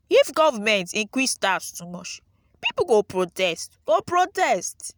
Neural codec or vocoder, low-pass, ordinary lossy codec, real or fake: none; none; none; real